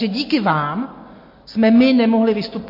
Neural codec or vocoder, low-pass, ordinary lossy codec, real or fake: none; 5.4 kHz; MP3, 32 kbps; real